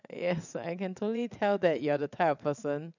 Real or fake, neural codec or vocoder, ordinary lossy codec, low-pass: fake; vocoder, 22.05 kHz, 80 mel bands, WaveNeXt; none; 7.2 kHz